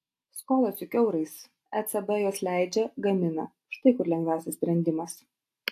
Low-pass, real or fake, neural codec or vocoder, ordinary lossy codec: 14.4 kHz; real; none; AAC, 64 kbps